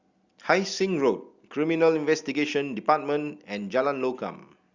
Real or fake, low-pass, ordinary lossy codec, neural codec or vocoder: real; 7.2 kHz; Opus, 64 kbps; none